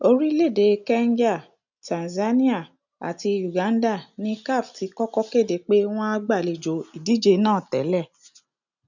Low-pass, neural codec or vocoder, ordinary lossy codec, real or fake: 7.2 kHz; none; none; real